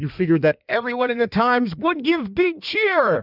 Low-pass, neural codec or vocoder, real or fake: 5.4 kHz; codec, 16 kHz in and 24 kHz out, 1.1 kbps, FireRedTTS-2 codec; fake